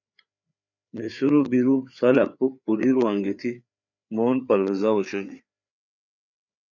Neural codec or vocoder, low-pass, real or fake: codec, 16 kHz, 4 kbps, FreqCodec, larger model; 7.2 kHz; fake